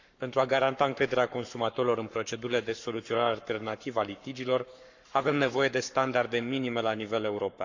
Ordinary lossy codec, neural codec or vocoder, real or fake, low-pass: none; codec, 44.1 kHz, 7.8 kbps, Pupu-Codec; fake; 7.2 kHz